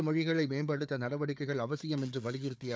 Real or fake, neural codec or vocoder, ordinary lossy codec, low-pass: fake; codec, 16 kHz, 4 kbps, FunCodec, trained on Chinese and English, 50 frames a second; none; none